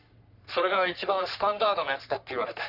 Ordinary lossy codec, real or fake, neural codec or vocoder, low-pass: none; fake; codec, 44.1 kHz, 3.4 kbps, Pupu-Codec; 5.4 kHz